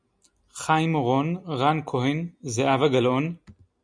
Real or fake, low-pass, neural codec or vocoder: real; 9.9 kHz; none